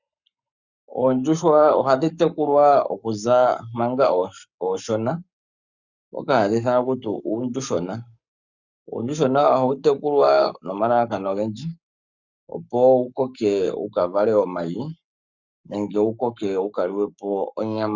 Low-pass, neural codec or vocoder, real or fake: 7.2 kHz; codec, 44.1 kHz, 7.8 kbps, Pupu-Codec; fake